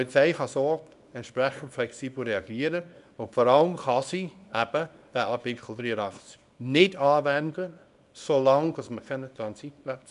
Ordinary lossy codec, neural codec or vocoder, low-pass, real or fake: none; codec, 24 kHz, 0.9 kbps, WavTokenizer, small release; 10.8 kHz; fake